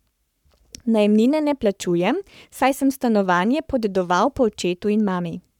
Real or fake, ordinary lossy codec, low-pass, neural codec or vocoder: fake; none; 19.8 kHz; codec, 44.1 kHz, 7.8 kbps, Pupu-Codec